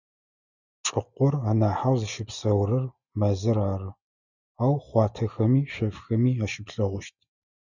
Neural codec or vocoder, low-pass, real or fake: none; 7.2 kHz; real